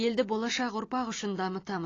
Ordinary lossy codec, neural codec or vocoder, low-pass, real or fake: AAC, 32 kbps; none; 7.2 kHz; real